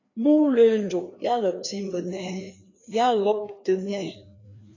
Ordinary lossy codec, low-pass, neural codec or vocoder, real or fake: AAC, 32 kbps; 7.2 kHz; codec, 16 kHz, 2 kbps, FreqCodec, larger model; fake